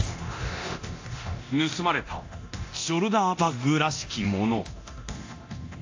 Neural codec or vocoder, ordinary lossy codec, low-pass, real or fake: codec, 24 kHz, 0.9 kbps, DualCodec; AAC, 48 kbps; 7.2 kHz; fake